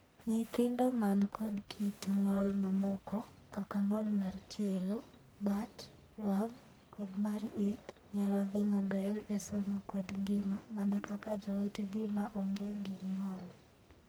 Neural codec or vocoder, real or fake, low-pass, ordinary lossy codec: codec, 44.1 kHz, 1.7 kbps, Pupu-Codec; fake; none; none